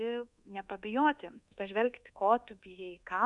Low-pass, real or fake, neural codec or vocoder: 10.8 kHz; fake; codec, 24 kHz, 1.2 kbps, DualCodec